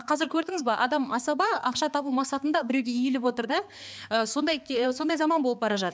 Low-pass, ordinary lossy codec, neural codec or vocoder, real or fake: none; none; codec, 16 kHz, 4 kbps, X-Codec, HuBERT features, trained on balanced general audio; fake